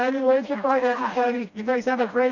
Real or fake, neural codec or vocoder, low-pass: fake; codec, 16 kHz, 1 kbps, FreqCodec, smaller model; 7.2 kHz